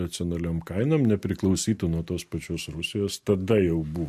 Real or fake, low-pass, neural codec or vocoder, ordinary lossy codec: real; 14.4 kHz; none; MP3, 64 kbps